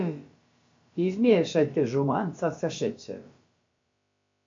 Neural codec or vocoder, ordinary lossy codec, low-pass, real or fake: codec, 16 kHz, about 1 kbps, DyCAST, with the encoder's durations; MP3, 48 kbps; 7.2 kHz; fake